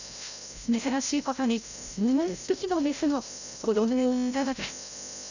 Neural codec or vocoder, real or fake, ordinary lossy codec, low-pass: codec, 16 kHz, 0.5 kbps, FreqCodec, larger model; fake; none; 7.2 kHz